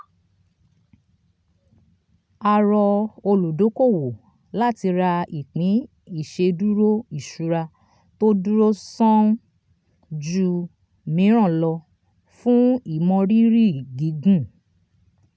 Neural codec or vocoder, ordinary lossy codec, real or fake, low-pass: none; none; real; none